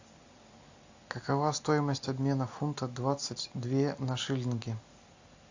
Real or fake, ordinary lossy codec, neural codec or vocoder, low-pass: real; AAC, 48 kbps; none; 7.2 kHz